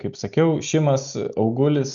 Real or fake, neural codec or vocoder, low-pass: real; none; 7.2 kHz